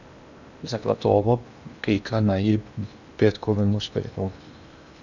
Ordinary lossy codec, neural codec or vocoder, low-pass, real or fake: none; codec, 16 kHz in and 24 kHz out, 0.8 kbps, FocalCodec, streaming, 65536 codes; 7.2 kHz; fake